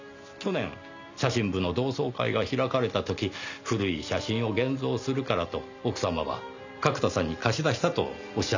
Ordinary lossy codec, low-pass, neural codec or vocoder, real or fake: none; 7.2 kHz; none; real